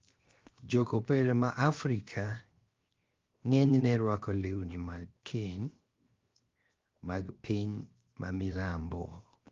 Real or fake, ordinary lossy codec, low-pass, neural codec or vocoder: fake; Opus, 32 kbps; 7.2 kHz; codec, 16 kHz, 0.7 kbps, FocalCodec